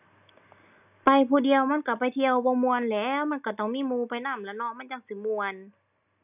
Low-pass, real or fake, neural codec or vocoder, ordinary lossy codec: 3.6 kHz; real; none; none